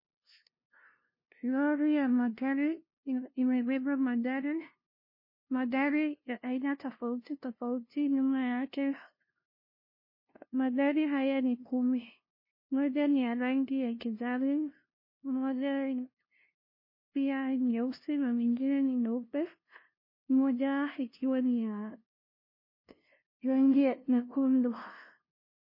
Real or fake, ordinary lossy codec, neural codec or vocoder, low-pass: fake; MP3, 24 kbps; codec, 16 kHz, 0.5 kbps, FunCodec, trained on LibriTTS, 25 frames a second; 5.4 kHz